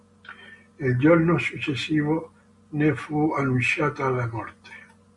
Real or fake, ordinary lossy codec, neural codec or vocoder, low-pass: real; MP3, 48 kbps; none; 10.8 kHz